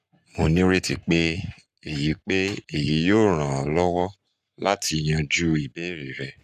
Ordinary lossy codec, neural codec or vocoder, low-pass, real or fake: none; codec, 44.1 kHz, 7.8 kbps, Pupu-Codec; 14.4 kHz; fake